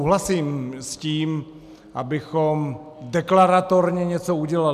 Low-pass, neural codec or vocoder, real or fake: 14.4 kHz; none; real